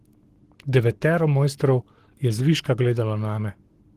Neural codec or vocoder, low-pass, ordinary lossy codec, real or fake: codec, 44.1 kHz, 7.8 kbps, Pupu-Codec; 14.4 kHz; Opus, 16 kbps; fake